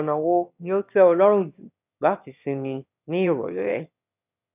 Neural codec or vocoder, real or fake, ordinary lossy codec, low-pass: autoencoder, 22.05 kHz, a latent of 192 numbers a frame, VITS, trained on one speaker; fake; none; 3.6 kHz